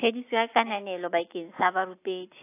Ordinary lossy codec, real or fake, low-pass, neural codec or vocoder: AAC, 24 kbps; real; 3.6 kHz; none